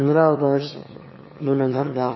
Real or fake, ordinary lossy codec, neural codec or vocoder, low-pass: fake; MP3, 24 kbps; autoencoder, 22.05 kHz, a latent of 192 numbers a frame, VITS, trained on one speaker; 7.2 kHz